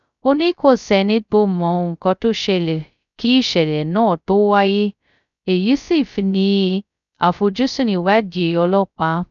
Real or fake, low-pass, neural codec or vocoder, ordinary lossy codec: fake; 7.2 kHz; codec, 16 kHz, 0.2 kbps, FocalCodec; none